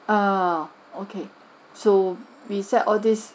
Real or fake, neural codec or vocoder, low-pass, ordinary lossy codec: real; none; none; none